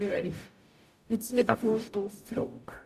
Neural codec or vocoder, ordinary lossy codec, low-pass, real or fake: codec, 44.1 kHz, 0.9 kbps, DAC; none; 14.4 kHz; fake